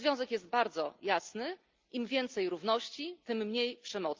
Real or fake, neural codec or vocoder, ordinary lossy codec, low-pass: real; none; Opus, 24 kbps; 7.2 kHz